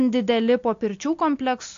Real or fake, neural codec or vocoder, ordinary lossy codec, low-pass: real; none; AAC, 48 kbps; 7.2 kHz